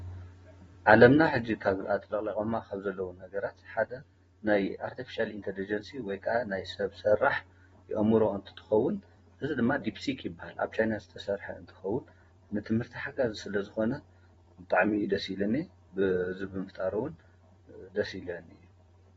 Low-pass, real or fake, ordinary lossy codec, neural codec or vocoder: 19.8 kHz; fake; AAC, 24 kbps; vocoder, 44.1 kHz, 128 mel bands every 256 samples, BigVGAN v2